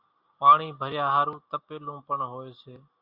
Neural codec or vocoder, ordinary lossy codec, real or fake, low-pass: none; Opus, 64 kbps; real; 5.4 kHz